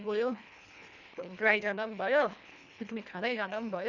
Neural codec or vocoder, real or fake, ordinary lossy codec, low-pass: codec, 24 kHz, 1.5 kbps, HILCodec; fake; none; 7.2 kHz